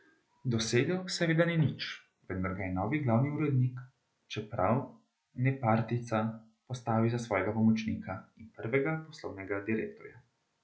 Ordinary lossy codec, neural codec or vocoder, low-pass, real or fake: none; none; none; real